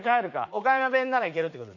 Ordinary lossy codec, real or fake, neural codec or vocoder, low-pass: none; fake; vocoder, 44.1 kHz, 80 mel bands, Vocos; 7.2 kHz